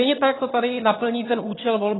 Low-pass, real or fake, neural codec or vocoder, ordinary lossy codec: 7.2 kHz; fake; vocoder, 22.05 kHz, 80 mel bands, HiFi-GAN; AAC, 16 kbps